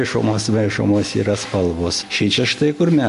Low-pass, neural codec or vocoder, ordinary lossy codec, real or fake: 14.4 kHz; none; MP3, 48 kbps; real